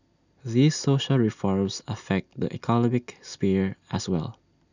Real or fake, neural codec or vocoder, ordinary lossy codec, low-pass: real; none; none; 7.2 kHz